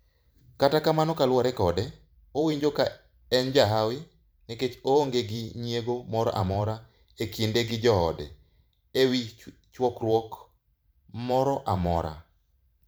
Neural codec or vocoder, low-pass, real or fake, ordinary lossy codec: vocoder, 44.1 kHz, 128 mel bands every 512 samples, BigVGAN v2; none; fake; none